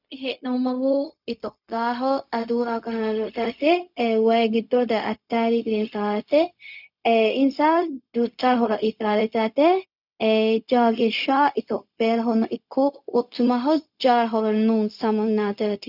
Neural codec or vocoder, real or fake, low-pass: codec, 16 kHz, 0.4 kbps, LongCat-Audio-Codec; fake; 5.4 kHz